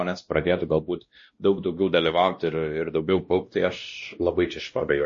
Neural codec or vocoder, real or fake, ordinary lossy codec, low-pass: codec, 16 kHz, 1 kbps, X-Codec, WavLM features, trained on Multilingual LibriSpeech; fake; MP3, 32 kbps; 7.2 kHz